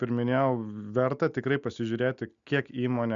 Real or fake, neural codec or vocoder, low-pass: real; none; 7.2 kHz